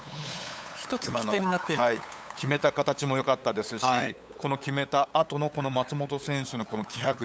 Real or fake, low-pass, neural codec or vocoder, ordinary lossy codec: fake; none; codec, 16 kHz, 8 kbps, FunCodec, trained on LibriTTS, 25 frames a second; none